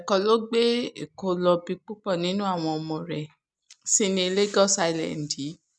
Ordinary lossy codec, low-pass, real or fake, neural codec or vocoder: none; none; real; none